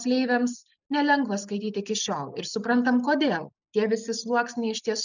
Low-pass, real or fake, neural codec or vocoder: 7.2 kHz; real; none